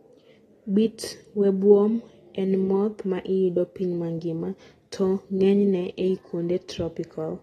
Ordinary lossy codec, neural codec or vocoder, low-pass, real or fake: AAC, 32 kbps; none; 14.4 kHz; real